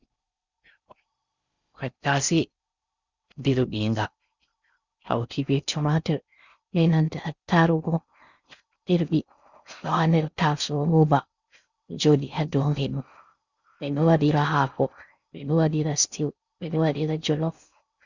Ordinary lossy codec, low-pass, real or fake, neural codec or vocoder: Opus, 64 kbps; 7.2 kHz; fake; codec, 16 kHz in and 24 kHz out, 0.6 kbps, FocalCodec, streaming, 4096 codes